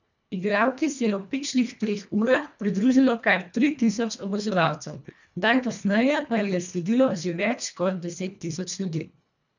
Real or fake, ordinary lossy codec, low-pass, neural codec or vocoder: fake; none; 7.2 kHz; codec, 24 kHz, 1.5 kbps, HILCodec